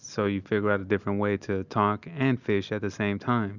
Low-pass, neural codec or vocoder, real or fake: 7.2 kHz; none; real